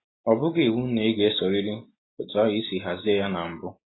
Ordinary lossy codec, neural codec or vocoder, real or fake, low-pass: AAC, 16 kbps; none; real; 7.2 kHz